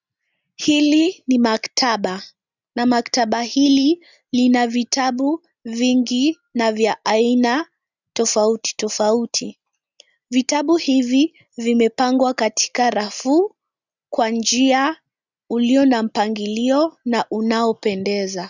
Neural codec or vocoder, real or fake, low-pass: none; real; 7.2 kHz